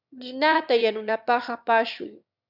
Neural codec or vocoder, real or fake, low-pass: autoencoder, 22.05 kHz, a latent of 192 numbers a frame, VITS, trained on one speaker; fake; 5.4 kHz